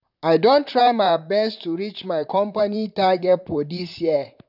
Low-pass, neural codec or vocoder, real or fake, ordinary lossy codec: 5.4 kHz; vocoder, 44.1 kHz, 128 mel bands, Pupu-Vocoder; fake; none